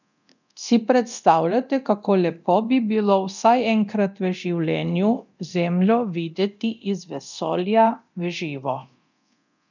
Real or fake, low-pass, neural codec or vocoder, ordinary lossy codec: fake; 7.2 kHz; codec, 24 kHz, 0.9 kbps, DualCodec; none